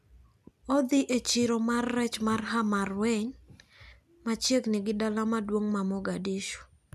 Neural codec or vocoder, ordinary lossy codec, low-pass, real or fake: none; none; 14.4 kHz; real